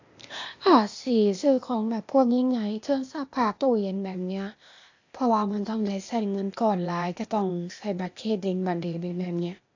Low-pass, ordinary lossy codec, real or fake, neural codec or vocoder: 7.2 kHz; AAC, 48 kbps; fake; codec, 16 kHz, 0.8 kbps, ZipCodec